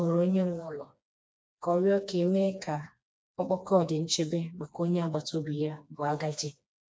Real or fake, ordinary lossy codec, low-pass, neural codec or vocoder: fake; none; none; codec, 16 kHz, 2 kbps, FreqCodec, smaller model